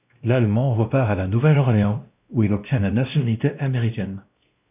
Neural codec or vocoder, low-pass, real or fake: codec, 16 kHz, 1 kbps, X-Codec, WavLM features, trained on Multilingual LibriSpeech; 3.6 kHz; fake